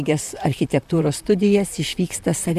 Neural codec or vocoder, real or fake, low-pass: vocoder, 44.1 kHz, 128 mel bands, Pupu-Vocoder; fake; 14.4 kHz